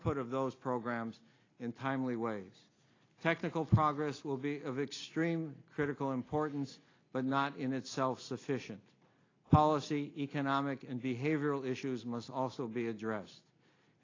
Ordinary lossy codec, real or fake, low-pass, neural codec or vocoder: AAC, 32 kbps; real; 7.2 kHz; none